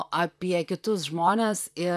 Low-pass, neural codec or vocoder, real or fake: 14.4 kHz; vocoder, 44.1 kHz, 128 mel bands, Pupu-Vocoder; fake